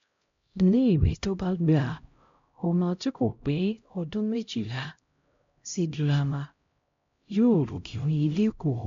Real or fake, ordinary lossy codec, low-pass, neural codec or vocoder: fake; MP3, 48 kbps; 7.2 kHz; codec, 16 kHz, 0.5 kbps, X-Codec, HuBERT features, trained on LibriSpeech